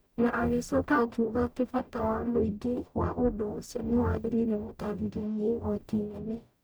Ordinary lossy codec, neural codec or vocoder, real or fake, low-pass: none; codec, 44.1 kHz, 0.9 kbps, DAC; fake; none